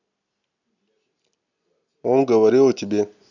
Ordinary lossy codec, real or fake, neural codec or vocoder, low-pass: none; real; none; 7.2 kHz